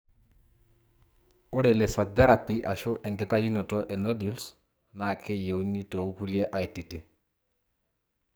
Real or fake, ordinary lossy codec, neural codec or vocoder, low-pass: fake; none; codec, 44.1 kHz, 2.6 kbps, SNAC; none